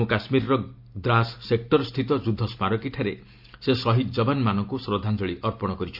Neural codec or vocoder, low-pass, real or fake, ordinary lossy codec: none; 5.4 kHz; real; MP3, 48 kbps